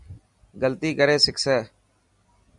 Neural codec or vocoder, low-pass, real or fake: none; 10.8 kHz; real